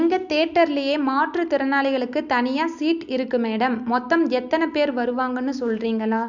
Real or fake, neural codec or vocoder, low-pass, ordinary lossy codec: real; none; 7.2 kHz; none